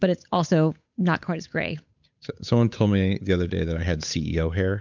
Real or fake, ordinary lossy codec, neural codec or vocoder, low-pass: fake; MP3, 64 kbps; codec, 16 kHz, 8 kbps, FunCodec, trained on Chinese and English, 25 frames a second; 7.2 kHz